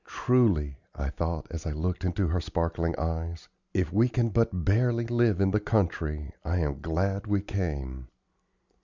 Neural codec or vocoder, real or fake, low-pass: none; real; 7.2 kHz